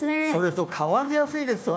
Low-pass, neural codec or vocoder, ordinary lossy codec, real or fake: none; codec, 16 kHz, 1 kbps, FunCodec, trained on Chinese and English, 50 frames a second; none; fake